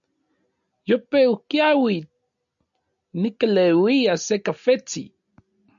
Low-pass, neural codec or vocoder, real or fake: 7.2 kHz; none; real